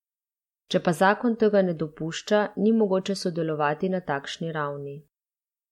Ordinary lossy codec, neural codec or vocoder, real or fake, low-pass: MP3, 64 kbps; none; real; 19.8 kHz